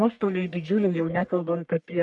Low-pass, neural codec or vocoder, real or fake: 10.8 kHz; codec, 44.1 kHz, 1.7 kbps, Pupu-Codec; fake